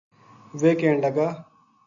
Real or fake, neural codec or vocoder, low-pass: real; none; 7.2 kHz